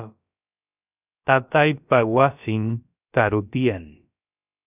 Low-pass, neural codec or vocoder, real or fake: 3.6 kHz; codec, 16 kHz, about 1 kbps, DyCAST, with the encoder's durations; fake